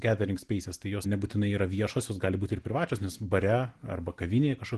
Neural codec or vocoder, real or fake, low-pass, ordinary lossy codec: none; real; 10.8 kHz; Opus, 16 kbps